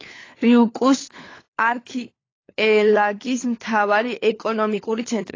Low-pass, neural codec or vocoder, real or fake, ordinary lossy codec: 7.2 kHz; codec, 16 kHz, 4 kbps, FunCodec, trained on LibriTTS, 50 frames a second; fake; AAC, 32 kbps